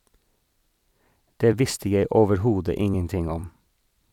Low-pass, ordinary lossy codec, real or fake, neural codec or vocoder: 19.8 kHz; none; fake; vocoder, 44.1 kHz, 128 mel bands every 256 samples, BigVGAN v2